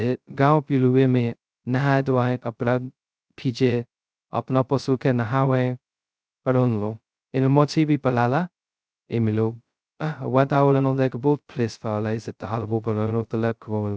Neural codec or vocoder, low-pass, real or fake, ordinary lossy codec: codec, 16 kHz, 0.2 kbps, FocalCodec; none; fake; none